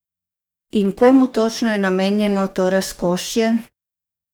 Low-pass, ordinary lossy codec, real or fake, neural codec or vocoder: none; none; fake; codec, 44.1 kHz, 2.6 kbps, DAC